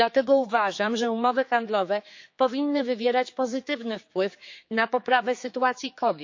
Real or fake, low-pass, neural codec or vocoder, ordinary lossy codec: fake; 7.2 kHz; codec, 16 kHz, 4 kbps, X-Codec, HuBERT features, trained on general audio; MP3, 48 kbps